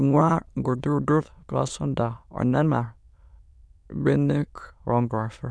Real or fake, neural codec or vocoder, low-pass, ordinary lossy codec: fake; autoencoder, 22.05 kHz, a latent of 192 numbers a frame, VITS, trained on many speakers; none; none